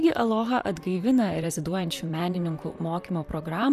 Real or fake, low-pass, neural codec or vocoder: fake; 14.4 kHz; vocoder, 44.1 kHz, 128 mel bands, Pupu-Vocoder